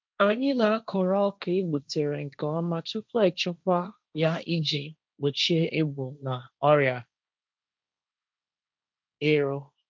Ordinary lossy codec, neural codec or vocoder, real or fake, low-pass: none; codec, 16 kHz, 1.1 kbps, Voila-Tokenizer; fake; none